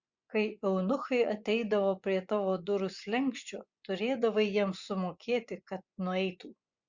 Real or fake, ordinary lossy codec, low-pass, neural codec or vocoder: fake; Opus, 64 kbps; 7.2 kHz; vocoder, 24 kHz, 100 mel bands, Vocos